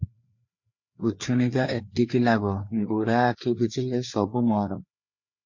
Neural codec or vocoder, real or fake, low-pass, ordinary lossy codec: codec, 16 kHz, 4 kbps, FreqCodec, larger model; fake; 7.2 kHz; MP3, 48 kbps